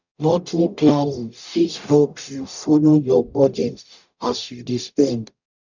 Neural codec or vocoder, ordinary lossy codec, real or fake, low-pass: codec, 44.1 kHz, 0.9 kbps, DAC; none; fake; 7.2 kHz